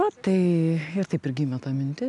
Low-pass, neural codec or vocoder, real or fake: 10.8 kHz; none; real